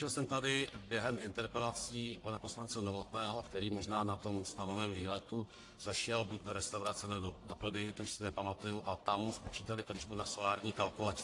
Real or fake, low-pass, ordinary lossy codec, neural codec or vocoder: fake; 10.8 kHz; AAC, 48 kbps; codec, 44.1 kHz, 1.7 kbps, Pupu-Codec